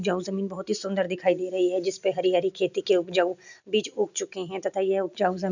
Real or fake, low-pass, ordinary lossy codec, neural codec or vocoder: real; 7.2 kHz; none; none